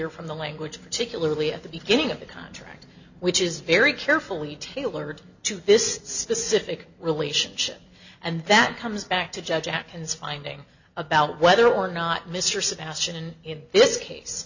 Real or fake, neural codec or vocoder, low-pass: real; none; 7.2 kHz